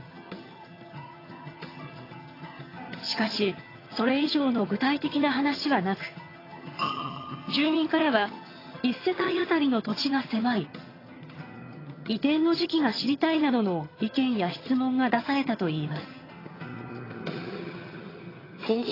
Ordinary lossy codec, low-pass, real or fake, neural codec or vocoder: AAC, 24 kbps; 5.4 kHz; fake; vocoder, 22.05 kHz, 80 mel bands, HiFi-GAN